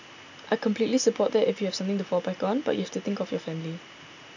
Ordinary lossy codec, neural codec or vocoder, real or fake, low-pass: none; none; real; 7.2 kHz